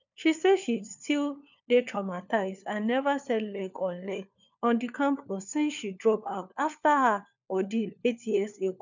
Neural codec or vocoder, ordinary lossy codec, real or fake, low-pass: codec, 16 kHz, 4 kbps, FunCodec, trained on LibriTTS, 50 frames a second; none; fake; 7.2 kHz